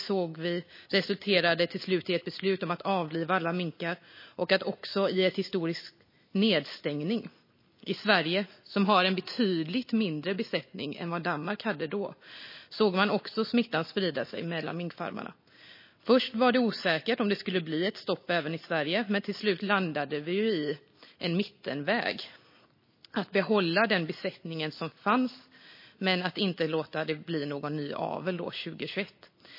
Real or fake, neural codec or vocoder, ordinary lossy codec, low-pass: real; none; MP3, 24 kbps; 5.4 kHz